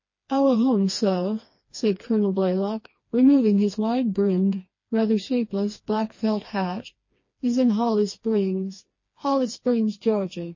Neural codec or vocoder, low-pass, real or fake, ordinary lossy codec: codec, 16 kHz, 2 kbps, FreqCodec, smaller model; 7.2 kHz; fake; MP3, 32 kbps